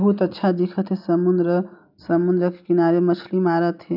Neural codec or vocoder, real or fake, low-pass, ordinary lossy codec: none; real; 5.4 kHz; none